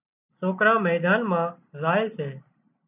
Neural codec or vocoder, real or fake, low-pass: none; real; 3.6 kHz